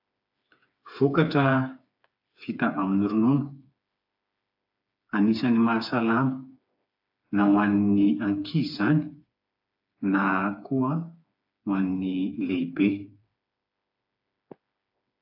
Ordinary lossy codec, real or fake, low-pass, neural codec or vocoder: AAC, 48 kbps; fake; 5.4 kHz; codec, 16 kHz, 4 kbps, FreqCodec, smaller model